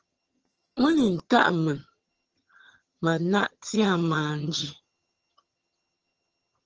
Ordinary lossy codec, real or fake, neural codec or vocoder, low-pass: Opus, 16 kbps; fake; vocoder, 22.05 kHz, 80 mel bands, HiFi-GAN; 7.2 kHz